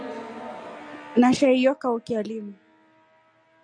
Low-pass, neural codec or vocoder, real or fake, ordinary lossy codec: 9.9 kHz; none; real; MP3, 96 kbps